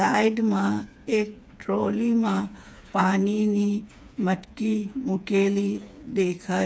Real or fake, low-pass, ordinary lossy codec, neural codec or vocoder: fake; none; none; codec, 16 kHz, 4 kbps, FreqCodec, smaller model